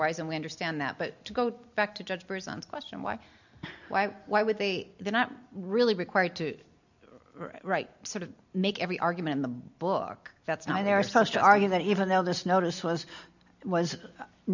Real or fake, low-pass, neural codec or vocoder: real; 7.2 kHz; none